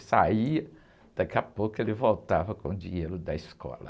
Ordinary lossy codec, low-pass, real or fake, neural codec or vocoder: none; none; real; none